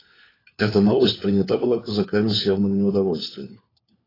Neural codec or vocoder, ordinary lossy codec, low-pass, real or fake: codec, 16 kHz, 4 kbps, FunCodec, trained on LibriTTS, 50 frames a second; AAC, 24 kbps; 5.4 kHz; fake